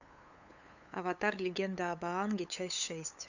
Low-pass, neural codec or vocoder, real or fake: 7.2 kHz; codec, 16 kHz, 8 kbps, FunCodec, trained on LibriTTS, 25 frames a second; fake